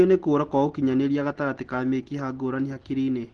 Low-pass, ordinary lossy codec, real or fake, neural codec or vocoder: 10.8 kHz; Opus, 16 kbps; real; none